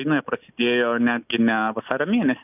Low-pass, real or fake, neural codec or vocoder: 3.6 kHz; real; none